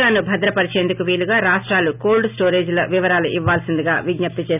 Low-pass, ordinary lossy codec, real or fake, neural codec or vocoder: 3.6 kHz; none; real; none